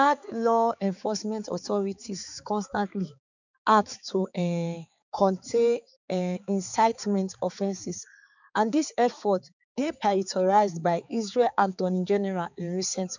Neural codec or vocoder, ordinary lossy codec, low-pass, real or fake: codec, 16 kHz, 4 kbps, X-Codec, HuBERT features, trained on balanced general audio; none; 7.2 kHz; fake